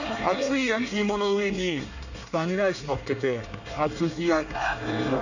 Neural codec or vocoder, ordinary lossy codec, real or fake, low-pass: codec, 24 kHz, 1 kbps, SNAC; none; fake; 7.2 kHz